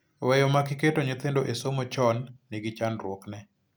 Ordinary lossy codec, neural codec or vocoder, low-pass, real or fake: none; none; none; real